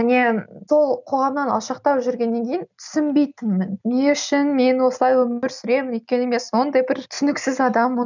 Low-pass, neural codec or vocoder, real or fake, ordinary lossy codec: 7.2 kHz; none; real; none